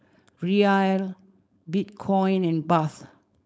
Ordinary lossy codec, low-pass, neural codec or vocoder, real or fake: none; none; codec, 16 kHz, 4.8 kbps, FACodec; fake